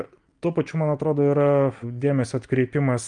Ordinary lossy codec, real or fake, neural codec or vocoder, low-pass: Opus, 32 kbps; real; none; 9.9 kHz